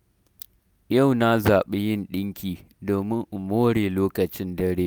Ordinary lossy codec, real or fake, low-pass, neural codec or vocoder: none; real; none; none